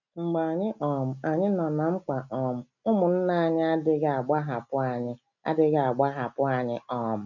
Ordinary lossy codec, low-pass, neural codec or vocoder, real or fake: none; 7.2 kHz; none; real